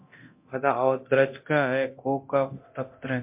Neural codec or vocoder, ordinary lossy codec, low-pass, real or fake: codec, 24 kHz, 0.9 kbps, DualCodec; MP3, 32 kbps; 3.6 kHz; fake